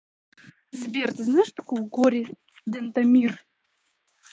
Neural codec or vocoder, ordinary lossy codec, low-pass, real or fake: codec, 16 kHz, 6 kbps, DAC; none; none; fake